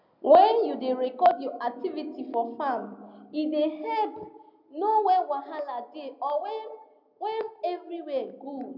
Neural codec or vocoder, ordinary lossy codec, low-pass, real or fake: none; none; 5.4 kHz; real